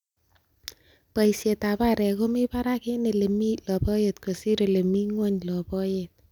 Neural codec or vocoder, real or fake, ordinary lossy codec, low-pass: none; real; none; 19.8 kHz